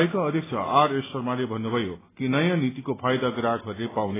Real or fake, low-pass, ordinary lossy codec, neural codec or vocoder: real; 3.6 kHz; AAC, 16 kbps; none